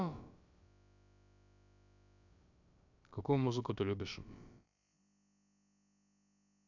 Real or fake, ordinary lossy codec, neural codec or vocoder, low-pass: fake; none; codec, 16 kHz, about 1 kbps, DyCAST, with the encoder's durations; 7.2 kHz